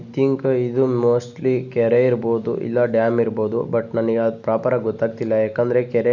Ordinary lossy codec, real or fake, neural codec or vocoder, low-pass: none; real; none; 7.2 kHz